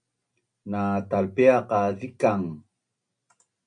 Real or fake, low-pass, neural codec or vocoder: real; 9.9 kHz; none